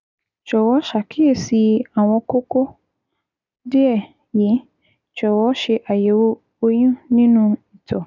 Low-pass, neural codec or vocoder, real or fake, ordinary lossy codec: 7.2 kHz; none; real; none